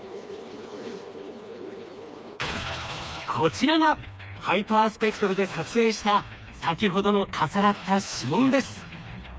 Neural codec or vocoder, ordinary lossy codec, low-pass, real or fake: codec, 16 kHz, 2 kbps, FreqCodec, smaller model; none; none; fake